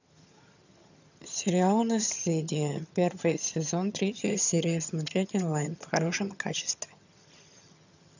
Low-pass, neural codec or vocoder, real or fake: 7.2 kHz; vocoder, 22.05 kHz, 80 mel bands, HiFi-GAN; fake